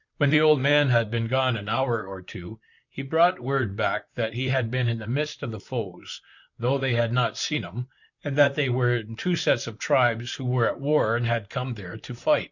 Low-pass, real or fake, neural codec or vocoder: 7.2 kHz; fake; vocoder, 44.1 kHz, 128 mel bands, Pupu-Vocoder